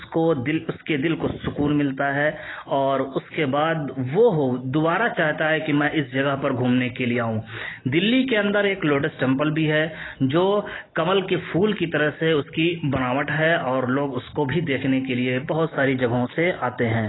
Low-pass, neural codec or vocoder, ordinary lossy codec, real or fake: 7.2 kHz; none; AAC, 16 kbps; real